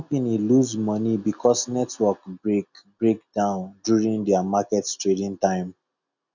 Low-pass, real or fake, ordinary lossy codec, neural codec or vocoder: 7.2 kHz; real; none; none